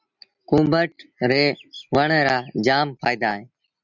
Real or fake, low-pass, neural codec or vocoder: real; 7.2 kHz; none